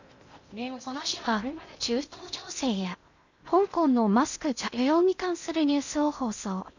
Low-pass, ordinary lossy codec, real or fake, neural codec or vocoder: 7.2 kHz; none; fake; codec, 16 kHz in and 24 kHz out, 0.6 kbps, FocalCodec, streaming, 2048 codes